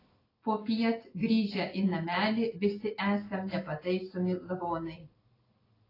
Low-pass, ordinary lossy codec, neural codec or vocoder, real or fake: 5.4 kHz; AAC, 24 kbps; none; real